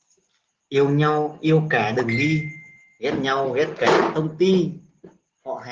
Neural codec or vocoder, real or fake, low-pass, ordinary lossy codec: none; real; 7.2 kHz; Opus, 16 kbps